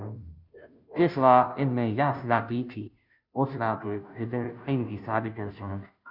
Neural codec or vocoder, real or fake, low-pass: codec, 16 kHz, 0.5 kbps, FunCodec, trained on Chinese and English, 25 frames a second; fake; 5.4 kHz